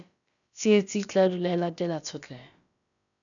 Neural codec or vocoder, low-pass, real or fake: codec, 16 kHz, about 1 kbps, DyCAST, with the encoder's durations; 7.2 kHz; fake